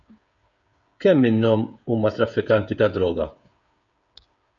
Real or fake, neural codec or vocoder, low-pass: fake; codec, 16 kHz, 8 kbps, FreqCodec, smaller model; 7.2 kHz